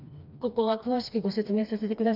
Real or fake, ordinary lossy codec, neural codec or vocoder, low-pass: fake; none; codec, 16 kHz, 2 kbps, FreqCodec, smaller model; 5.4 kHz